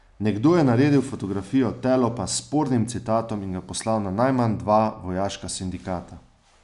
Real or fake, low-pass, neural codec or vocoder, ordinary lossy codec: real; 10.8 kHz; none; none